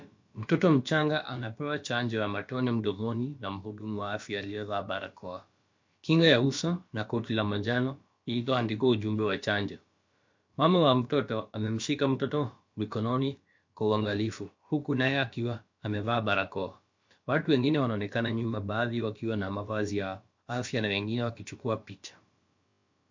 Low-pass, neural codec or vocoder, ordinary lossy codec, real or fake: 7.2 kHz; codec, 16 kHz, about 1 kbps, DyCAST, with the encoder's durations; MP3, 48 kbps; fake